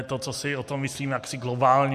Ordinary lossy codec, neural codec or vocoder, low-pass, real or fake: MP3, 64 kbps; none; 14.4 kHz; real